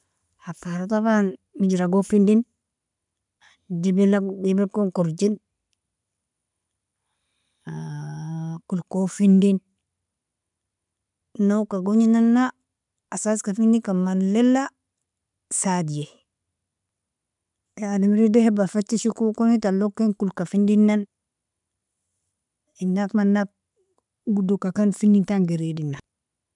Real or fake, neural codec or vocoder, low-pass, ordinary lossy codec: real; none; 10.8 kHz; none